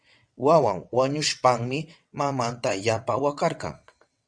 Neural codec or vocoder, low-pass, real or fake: vocoder, 22.05 kHz, 80 mel bands, WaveNeXt; 9.9 kHz; fake